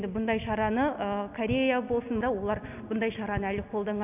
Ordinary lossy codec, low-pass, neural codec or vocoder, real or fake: none; 3.6 kHz; none; real